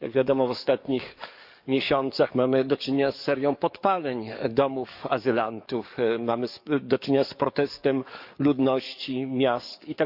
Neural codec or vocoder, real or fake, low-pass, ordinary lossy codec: codec, 44.1 kHz, 7.8 kbps, DAC; fake; 5.4 kHz; none